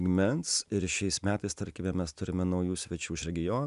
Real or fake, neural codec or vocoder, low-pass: real; none; 10.8 kHz